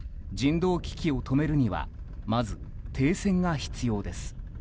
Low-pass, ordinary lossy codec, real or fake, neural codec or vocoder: none; none; real; none